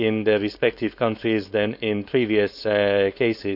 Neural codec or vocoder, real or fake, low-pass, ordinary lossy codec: codec, 16 kHz, 4.8 kbps, FACodec; fake; 5.4 kHz; none